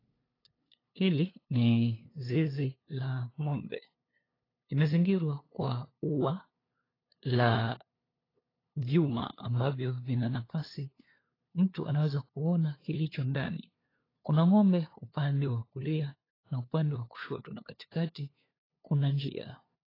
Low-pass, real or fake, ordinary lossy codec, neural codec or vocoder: 5.4 kHz; fake; AAC, 24 kbps; codec, 16 kHz, 2 kbps, FunCodec, trained on LibriTTS, 25 frames a second